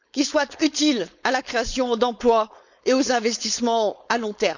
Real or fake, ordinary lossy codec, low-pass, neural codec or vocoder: fake; none; 7.2 kHz; codec, 16 kHz, 4.8 kbps, FACodec